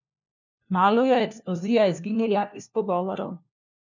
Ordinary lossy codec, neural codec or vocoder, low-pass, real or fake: none; codec, 16 kHz, 1 kbps, FunCodec, trained on LibriTTS, 50 frames a second; 7.2 kHz; fake